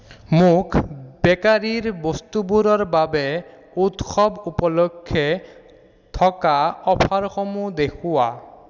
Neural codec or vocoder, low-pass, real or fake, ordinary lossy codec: none; 7.2 kHz; real; none